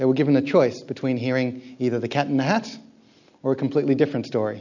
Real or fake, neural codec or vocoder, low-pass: real; none; 7.2 kHz